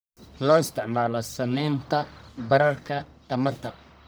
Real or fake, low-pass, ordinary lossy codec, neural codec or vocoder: fake; none; none; codec, 44.1 kHz, 1.7 kbps, Pupu-Codec